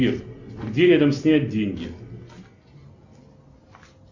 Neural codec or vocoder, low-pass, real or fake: none; 7.2 kHz; real